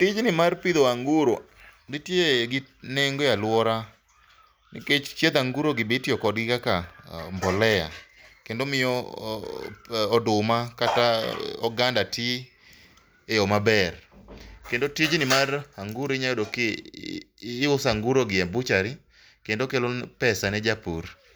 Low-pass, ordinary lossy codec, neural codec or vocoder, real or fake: none; none; none; real